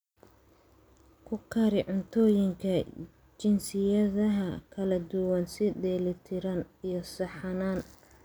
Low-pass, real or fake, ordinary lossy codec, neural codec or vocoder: none; real; none; none